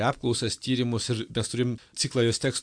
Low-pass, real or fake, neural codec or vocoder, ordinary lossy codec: 9.9 kHz; real; none; AAC, 64 kbps